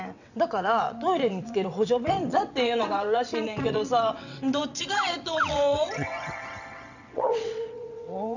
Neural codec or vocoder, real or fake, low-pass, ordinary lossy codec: vocoder, 22.05 kHz, 80 mel bands, WaveNeXt; fake; 7.2 kHz; none